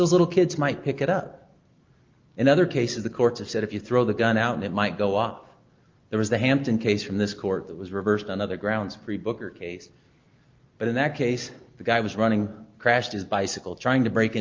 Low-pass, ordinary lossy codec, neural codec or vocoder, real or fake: 7.2 kHz; Opus, 32 kbps; none; real